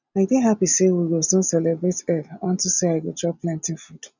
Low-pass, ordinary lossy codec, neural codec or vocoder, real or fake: 7.2 kHz; none; none; real